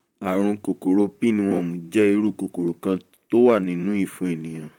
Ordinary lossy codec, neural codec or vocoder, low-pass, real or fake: none; vocoder, 44.1 kHz, 128 mel bands, Pupu-Vocoder; 19.8 kHz; fake